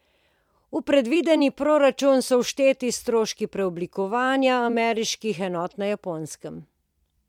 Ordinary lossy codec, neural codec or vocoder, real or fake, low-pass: MP3, 96 kbps; vocoder, 44.1 kHz, 128 mel bands every 256 samples, BigVGAN v2; fake; 19.8 kHz